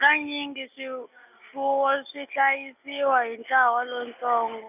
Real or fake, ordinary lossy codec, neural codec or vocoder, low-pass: real; none; none; 3.6 kHz